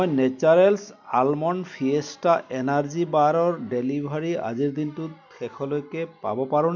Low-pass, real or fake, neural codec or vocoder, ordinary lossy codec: 7.2 kHz; real; none; none